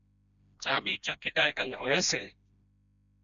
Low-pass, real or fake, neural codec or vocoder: 7.2 kHz; fake; codec, 16 kHz, 1 kbps, FreqCodec, smaller model